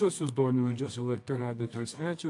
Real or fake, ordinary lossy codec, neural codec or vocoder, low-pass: fake; AAC, 64 kbps; codec, 24 kHz, 0.9 kbps, WavTokenizer, medium music audio release; 10.8 kHz